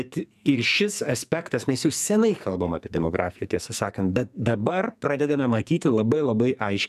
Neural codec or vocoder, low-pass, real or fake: codec, 44.1 kHz, 2.6 kbps, SNAC; 14.4 kHz; fake